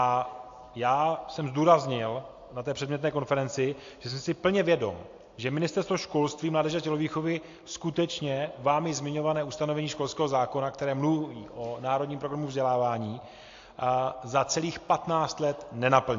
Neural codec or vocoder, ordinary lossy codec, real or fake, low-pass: none; AAC, 48 kbps; real; 7.2 kHz